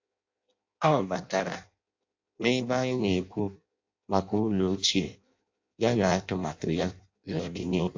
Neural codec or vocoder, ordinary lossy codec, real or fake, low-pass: codec, 16 kHz in and 24 kHz out, 0.6 kbps, FireRedTTS-2 codec; AAC, 48 kbps; fake; 7.2 kHz